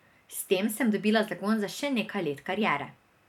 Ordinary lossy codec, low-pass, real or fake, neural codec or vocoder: none; 19.8 kHz; fake; vocoder, 44.1 kHz, 128 mel bands every 256 samples, BigVGAN v2